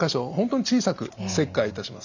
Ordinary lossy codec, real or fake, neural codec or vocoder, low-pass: none; real; none; 7.2 kHz